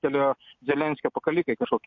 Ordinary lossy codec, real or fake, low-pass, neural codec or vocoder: MP3, 48 kbps; real; 7.2 kHz; none